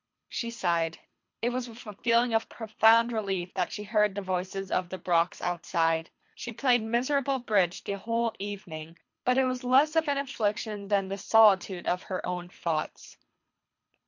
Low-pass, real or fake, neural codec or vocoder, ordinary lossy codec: 7.2 kHz; fake; codec, 24 kHz, 3 kbps, HILCodec; MP3, 48 kbps